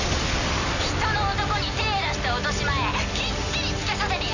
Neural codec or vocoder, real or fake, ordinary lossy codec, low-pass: none; real; none; 7.2 kHz